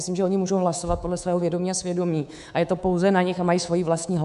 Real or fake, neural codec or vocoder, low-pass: fake; codec, 24 kHz, 3.1 kbps, DualCodec; 10.8 kHz